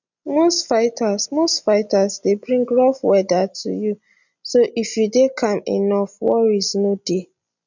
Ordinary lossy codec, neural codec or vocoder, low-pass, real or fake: none; none; 7.2 kHz; real